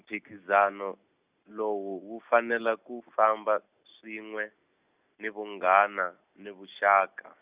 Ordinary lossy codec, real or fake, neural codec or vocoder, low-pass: Opus, 64 kbps; real; none; 3.6 kHz